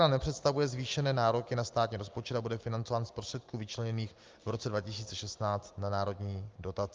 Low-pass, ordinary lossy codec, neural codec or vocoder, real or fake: 7.2 kHz; Opus, 32 kbps; none; real